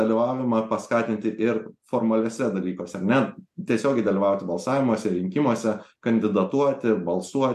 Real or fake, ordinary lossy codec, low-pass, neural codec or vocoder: real; MP3, 64 kbps; 14.4 kHz; none